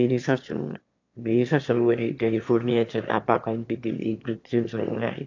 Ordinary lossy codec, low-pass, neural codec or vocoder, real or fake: AAC, 48 kbps; 7.2 kHz; autoencoder, 22.05 kHz, a latent of 192 numbers a frame, VITS, trained on one speaker; fake